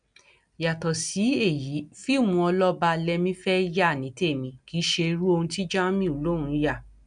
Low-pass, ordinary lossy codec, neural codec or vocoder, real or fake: 9.9 kHz; MP3, 96 kbps; none; real